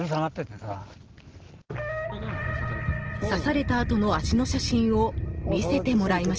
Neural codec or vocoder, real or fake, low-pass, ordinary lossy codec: none; real; 7.2 kHz; Opus, 16 kbps